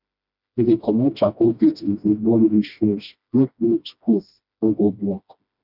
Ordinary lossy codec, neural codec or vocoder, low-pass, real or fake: none; codec, 16 kHz, 1 kbps, FreqCodec, smaller model; 5.4 kHz; fake